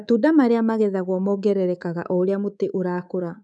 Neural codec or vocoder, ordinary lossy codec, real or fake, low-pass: codec, 24 kHz, 3.1 kbps, DualCodec; none; fake; none